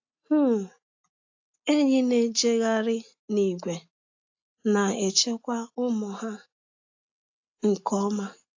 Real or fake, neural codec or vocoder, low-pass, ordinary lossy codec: real; none; 7.2 kHz; AAC, 48 kbps